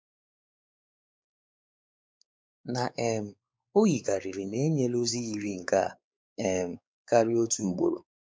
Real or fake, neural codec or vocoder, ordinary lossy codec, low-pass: fake; codec, 16 kHz, 4 kbps, X-Codec, WavLM features, trained on Multilingual LibriSpeech; none; none